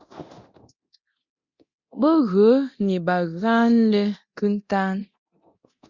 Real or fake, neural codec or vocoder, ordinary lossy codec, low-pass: fake; codec, 24 kHz, 0.9 kbps, DualCodec; Opus, 64 kbps; 7.2 kHz